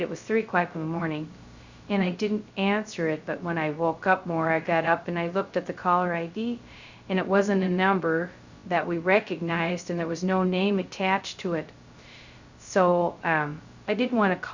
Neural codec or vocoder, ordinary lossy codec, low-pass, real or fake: codec, 16 kHz, 0.2 kbps, FocalCodec; Opus, 64 kbps; 7.2 kHz; fake